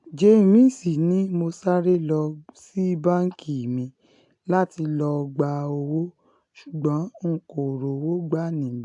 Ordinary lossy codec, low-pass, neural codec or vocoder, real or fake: AAC, 64 kbps; 10.8 kHz; none; real